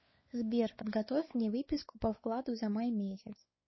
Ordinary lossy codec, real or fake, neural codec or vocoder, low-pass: MP3, 24 kbps; fake; codec, 16 kHz, 4 kbps, X-Codec, WavLM features, trained on Multilingual LibriSpeech; 7.2 kHz